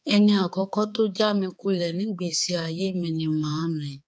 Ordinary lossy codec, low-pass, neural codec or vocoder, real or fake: none; none; codec, 16 kHz, 4 kbps, X-Codec, HuBERT features, trained on balanced general audio; fake